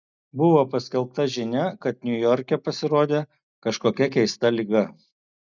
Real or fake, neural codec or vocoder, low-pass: real; none; 7.2 kHz